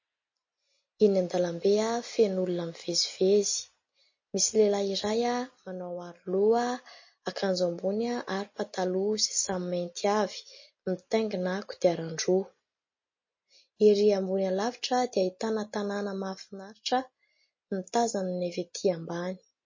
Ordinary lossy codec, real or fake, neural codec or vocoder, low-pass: MP3, 32 kbps; real; none; 7.2 kHz